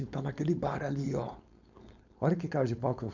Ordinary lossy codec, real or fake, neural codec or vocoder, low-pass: none; fake; codec, 16 kHz, 4.8 kbps, FACodec; 7.2 kHz